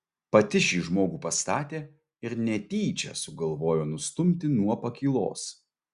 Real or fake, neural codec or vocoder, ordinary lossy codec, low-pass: real; none; Opus, 64 kbps; 10.8 kHz